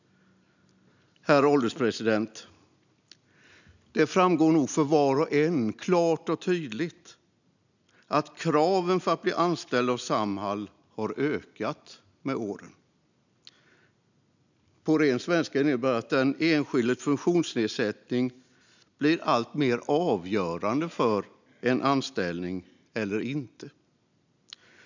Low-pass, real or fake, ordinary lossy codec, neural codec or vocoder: 7.2 kHz; real; none; none